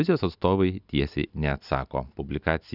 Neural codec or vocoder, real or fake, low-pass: none; real; 5.4 kHz